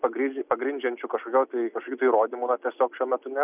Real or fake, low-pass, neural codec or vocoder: real; 3.6 kHz; none